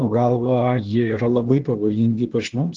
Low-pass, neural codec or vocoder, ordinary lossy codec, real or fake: 7.2 kHz; codec, 16 kHz, 0.8 kbps, ZipCodec; Opus, 16 kbps; fake